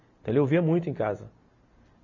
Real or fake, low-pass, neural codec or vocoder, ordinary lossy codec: real; 7.2 kHz; none; AAC, 48 kbps